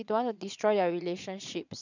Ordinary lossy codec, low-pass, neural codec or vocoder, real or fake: none; 7.2 kHz; vocoder, 22.05 kHz, 80 mel bands, Vocos; fake